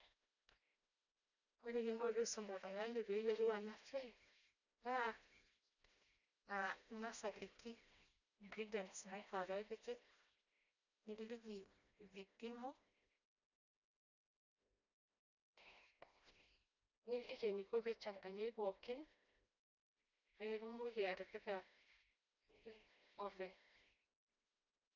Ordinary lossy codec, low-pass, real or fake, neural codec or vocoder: none; 7.2 kHz; fake; codec, 16 kHz, 1 kbps, FreqCodec, smaller model